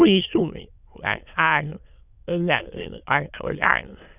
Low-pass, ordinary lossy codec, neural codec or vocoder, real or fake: 3.6 kHz; none; autoencoder, 22.05 kHz, a latent of 192 numbers a frame, VITS, trained on many speakers; fake